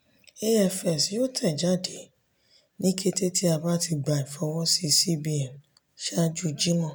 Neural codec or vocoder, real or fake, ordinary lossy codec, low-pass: none; real; none; none